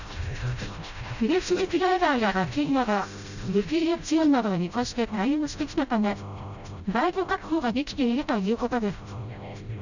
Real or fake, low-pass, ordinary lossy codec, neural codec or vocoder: fake; 7.2 kHz; none; codec, 16 kHz, 0.5 kbps, FreqCodec, smaller model